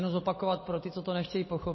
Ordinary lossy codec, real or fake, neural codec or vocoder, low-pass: MP3, 24 kbps; real; none; 7.2 kHz